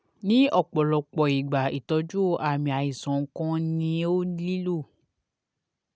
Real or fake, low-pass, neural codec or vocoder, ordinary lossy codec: real; none; none; none